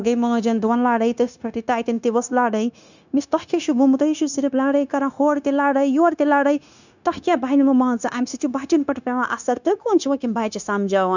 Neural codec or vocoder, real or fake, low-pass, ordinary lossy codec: codec, 16 kHz, 0.9 kbps, LongCat-Audio-Codec; fake; 7.2 kHz; none